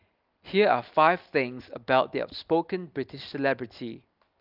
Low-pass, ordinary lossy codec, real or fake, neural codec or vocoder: 5.4 kHz; Opus, 24 kbps; real; none